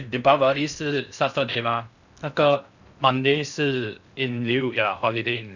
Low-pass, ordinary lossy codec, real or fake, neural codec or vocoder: 7.2 kHz; none; fake; codec, 16 kHz in and 24 kHz out, 0.6 kbps, FocalCodec, streaming, 4096 codes